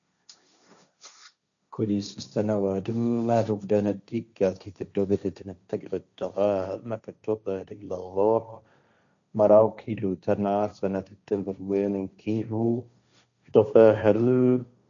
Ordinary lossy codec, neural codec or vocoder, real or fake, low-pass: none; codec, 16 kHz, 1.1 kbps, Voila-Tokenizer; fake; 7.2 kHz